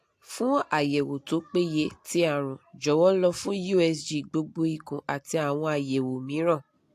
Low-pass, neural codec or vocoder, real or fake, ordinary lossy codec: 14.4 kHz; none; real; AAC, 64 kbps